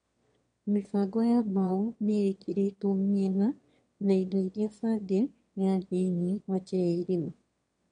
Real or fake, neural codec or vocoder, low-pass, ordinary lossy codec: fake; autoencoder, 22.05 kHz, a latent of 192 numbers a frame, VITS, trained on one speaker; 9.9 kHz; MP3, 48 kbps